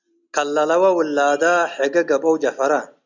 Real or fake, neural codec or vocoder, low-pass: real; none; 7.2 kHz